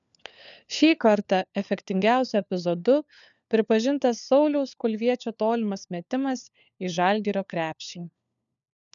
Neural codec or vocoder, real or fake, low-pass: codec, 16 kHz, 4 kbps, FunCodec, trained on LibriTTS, 50 frames a second; fake; 7.2 kHz